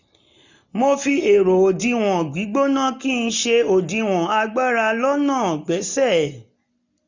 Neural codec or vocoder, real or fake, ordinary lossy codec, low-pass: none; real; none; 7.2 kHz